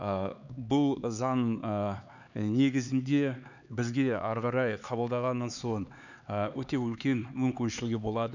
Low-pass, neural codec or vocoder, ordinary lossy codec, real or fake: 7.2 kHz; codec, 16 kHz, 4 kbps, X-Codec, HuBERT features, trained on LibriSpeech; none; fake